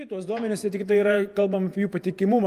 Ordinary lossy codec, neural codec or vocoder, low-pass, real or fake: Opus, 32 kbps; vocoder, 48 kHz, 128 mel bands, Vocos; 14.4 kHz; fake